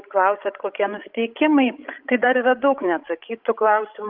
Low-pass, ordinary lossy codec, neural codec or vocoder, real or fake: 5.4 kHz; Opus, 24 kbps; codec, 16 kHz, 16 kbps, FreqCodec, larger model; fake